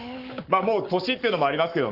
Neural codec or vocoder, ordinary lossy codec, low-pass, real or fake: codec, 44.1 kHz, 7.8 kbps, Pupu-Codec; Opus, 24 kbps; 5.4 kHz; fake